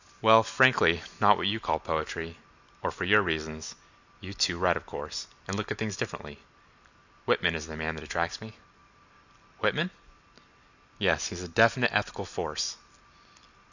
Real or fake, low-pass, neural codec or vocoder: real; 7.2 kHz; none